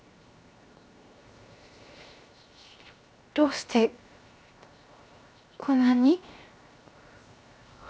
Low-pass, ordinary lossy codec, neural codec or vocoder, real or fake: none; none; codec, 16 kHz, 0.7 kbps, FocalCodec; fake